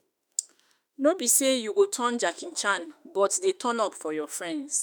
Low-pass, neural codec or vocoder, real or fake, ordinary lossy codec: none; autoencoder, 48 kHz, 32 numbers a frame, DAC-VAE, trained on Japanese speech; fake; none